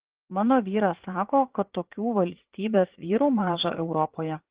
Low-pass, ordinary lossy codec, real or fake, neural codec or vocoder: 3.6 kHz; Opus, 16 kbps; fake; vocoder, 44.1 kHz, 80 mel bands, Vocos